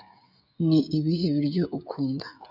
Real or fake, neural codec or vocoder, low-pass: fake; codec, 16 kHz, 16 kbps, FreqCodec, smaller model; 5.4 kHz